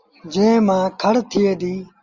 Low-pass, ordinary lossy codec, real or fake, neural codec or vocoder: 7.2 kHz; Opus, 32 kbps; real; none